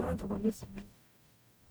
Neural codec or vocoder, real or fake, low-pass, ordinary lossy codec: codec, 44.1 kHz, 0.9 kbps, DAC; fake; none; none